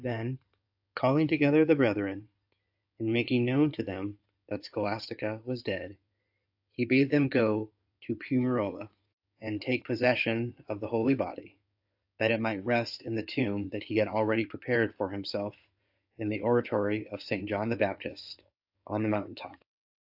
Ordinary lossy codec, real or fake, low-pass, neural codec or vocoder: AAC, 48 kbps; fake; 5.4 kHz; codec, 16 kHz in and 24 kHz out, 2.2 kbps, FireRedTTS-2 codec